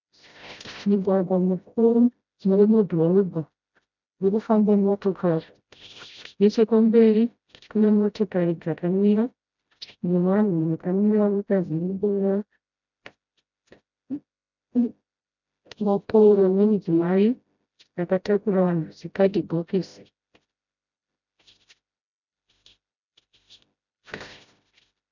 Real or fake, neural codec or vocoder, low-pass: fake; codec, 16 kHz, 0.5 kbps, FreqCodec, smaller model; 7.2 kHz